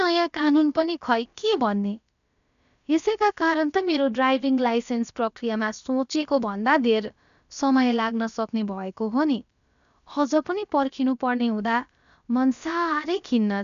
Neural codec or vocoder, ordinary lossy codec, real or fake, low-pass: codec, 16 kHz, about 1 kbps, DyCAST, with the encoder's durations; none; fake; 7.2 kHz